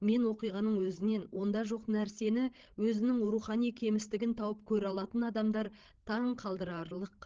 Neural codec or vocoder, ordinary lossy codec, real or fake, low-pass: codec, 16 kHz, 16 kbps, FreqCodec, larger model; Opus, 16 kbps; fake; 7.2 kHz